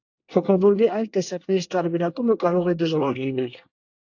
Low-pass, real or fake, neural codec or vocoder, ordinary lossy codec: 7.2 kHz; fake; codec, 24 kHz, 1 kbps, SNAC; AAC, 48 kbps